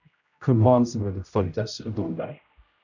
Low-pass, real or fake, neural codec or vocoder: 7.2 kHz; fake; codec, 16 kHz, 0.5 kbps, X-Codec, HuBERT features, trained on general audio